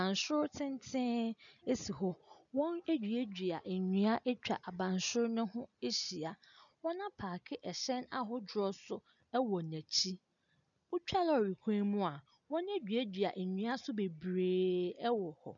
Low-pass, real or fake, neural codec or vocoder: 7.2 kHz; real; none